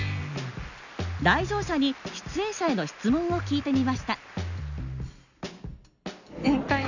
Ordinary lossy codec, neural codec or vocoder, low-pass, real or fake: none; none; 7.2 kHz; real